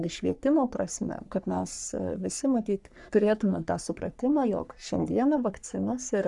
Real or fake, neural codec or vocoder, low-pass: fake; codec, 44.1 kHz, 3.4 kbps, Pupu-Codec; 10.8 kHz